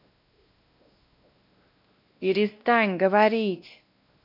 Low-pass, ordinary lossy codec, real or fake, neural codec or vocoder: 5.4 kHz; none; fake; codec, 16 kHz, 1 kbps, X-Codec, WavLM features, trained on Multilingual LibriSpeech